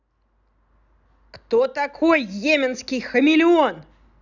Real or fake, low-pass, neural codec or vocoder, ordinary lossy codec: real; 7.2 kHz; none; none